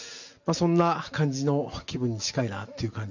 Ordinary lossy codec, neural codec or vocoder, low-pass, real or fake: none; none; 7.2 kHz; real